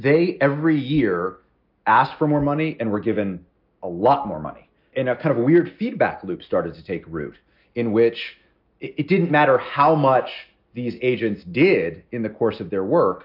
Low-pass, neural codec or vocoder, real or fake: 5.4 kHz; none; real